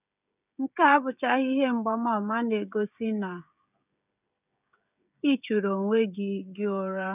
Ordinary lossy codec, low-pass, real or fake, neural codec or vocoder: none; 3.6 kHz; fake; codec, 16 kHz, 16 kbps, FreqCodec, smaller model